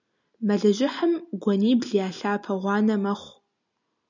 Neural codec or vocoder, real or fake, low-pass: none; real; 7.2 kHz